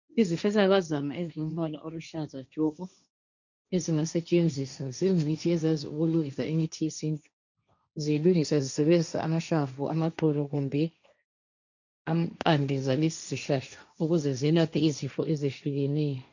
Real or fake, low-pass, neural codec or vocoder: fake; 7.2 kHz; codec, 16 kHz, 1.1 kbps, Voila-Tokenizer